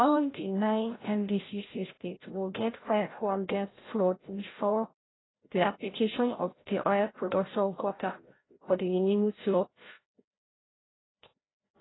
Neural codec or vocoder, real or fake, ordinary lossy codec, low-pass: codec, 16 kHz, 0.5 kbps, FreqCodec, larger model; fake; AAC, 16 kbps; 7.2 kHz